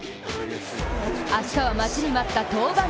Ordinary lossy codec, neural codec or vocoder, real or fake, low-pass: none; none; real; none